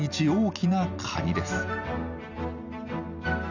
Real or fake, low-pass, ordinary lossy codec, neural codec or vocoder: real; 7.2 kHz; none; none